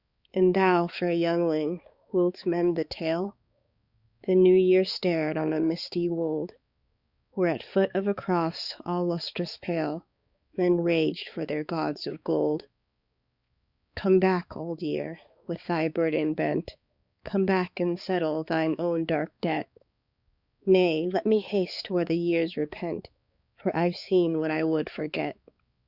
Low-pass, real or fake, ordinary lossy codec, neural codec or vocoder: 5.4 kHz; fake; Opus, 64 kbps; codec, 16 kHz, 4 kbps, X-Codec, HuBERT features, trained on balanced general audio